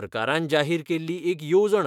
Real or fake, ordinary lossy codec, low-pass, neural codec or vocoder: fake; none; 19.8 kHz; autoencoder, 48 kHz, 128 numbers a frame, DAC-VAE, trained on Japanese speech